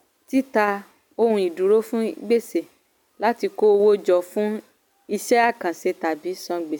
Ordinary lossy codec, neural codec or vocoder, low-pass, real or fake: none; none; 19.8 kHz; real